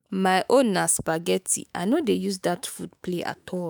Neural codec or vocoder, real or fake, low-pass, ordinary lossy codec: autoencoder, 48 kHz, 128 numbers a frame, DAC-VAE, trained on Japanese speech; fake; none; none